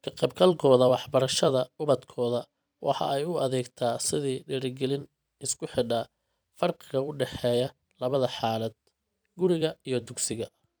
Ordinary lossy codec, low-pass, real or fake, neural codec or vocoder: none; none; fake; vocoder, 44.1 kHz, 128 mel bands every 256 samples, BigVGAN v2